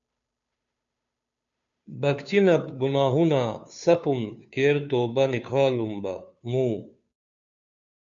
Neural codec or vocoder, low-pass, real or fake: codec, 16 kHz, 2 kbps, FunCodec, trained on Chinese and English, 25 frames a second; 7.2 kHz; fake